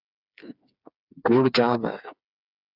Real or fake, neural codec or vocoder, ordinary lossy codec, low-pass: fake; codec, 16 kHz, 4 kbps, FreqCodec, smaller model; Opus, 64 kbps; 5.4 kHz